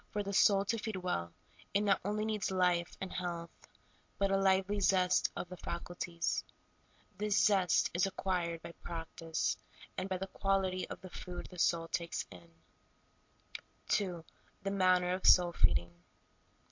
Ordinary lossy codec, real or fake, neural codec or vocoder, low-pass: MP3, 48 kbps; real; none; 7.2 kHz